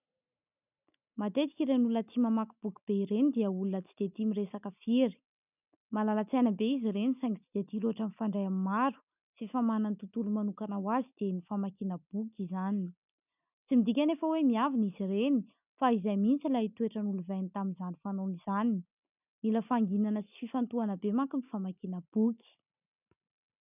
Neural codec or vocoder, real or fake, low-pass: none; real; 3.6 kHz